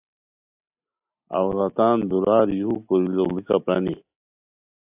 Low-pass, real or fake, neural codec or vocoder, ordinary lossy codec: 3.6 kHz; real; none; AAC, 32 kbps